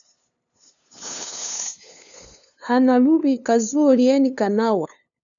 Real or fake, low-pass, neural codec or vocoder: fake; 7.2 kHz; codec, 16 kHz, 2 kbps, FunCodec, trained on LibriTTS, 25 frames a second